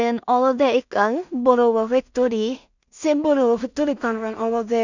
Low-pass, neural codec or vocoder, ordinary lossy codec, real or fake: 7.2 kHz; codec, 16 kHz in and 24 kHz out, 0.4 kbps, LongCat-Audio-Codec, two codebook decoder; none; fake